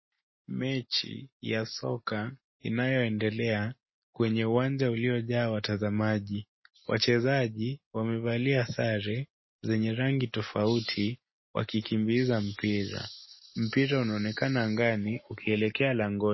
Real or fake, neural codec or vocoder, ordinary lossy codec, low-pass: real; none; MP3, 24 kbps; 7.2 kHz